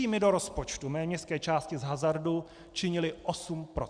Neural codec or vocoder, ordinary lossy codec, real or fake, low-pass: none; Opus, 64 kbps; real; 9.9 kHz